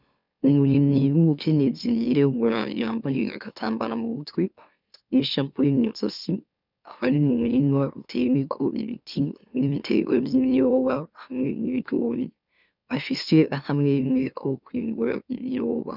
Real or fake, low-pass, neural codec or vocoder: fake; 5.4 kHz; autoencoder, 44.1 kHz, a latent of 192 numbers a frame, MeloTTS